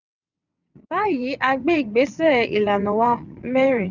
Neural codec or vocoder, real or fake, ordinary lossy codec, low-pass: vocoder, 22.05 kHz, 80 mel bands, WaveNeXt; fake; none; 7.2 kHz